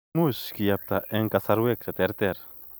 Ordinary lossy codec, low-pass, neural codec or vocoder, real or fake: none; none; vocoder, 44.1 kHz, 128 mel bands every 256 samples, BigVGAN v2; fake